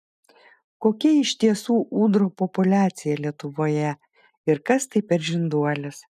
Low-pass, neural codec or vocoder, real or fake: 14.4 kHz; none; real